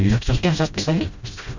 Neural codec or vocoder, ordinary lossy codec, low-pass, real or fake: codec, 16 kHz, 0.5 kbps, FreqCodec, smaller model; Opus, 64 kbps; 7.2 kHz; fake